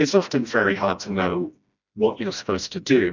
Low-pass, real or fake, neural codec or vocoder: 7.2 kHz; fake; codec, 16 kHz, 1 kbps, FreqCodec, smaller model